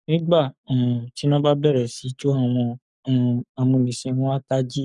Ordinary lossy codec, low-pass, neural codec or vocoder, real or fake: none; 10.8 kHz; codec, 44.1 kHz, 7.8 kbps, Pupu-Codec; fake